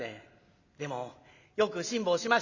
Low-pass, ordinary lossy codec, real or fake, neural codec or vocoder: 7.2 kHz; Opus, 64 kbps; real; none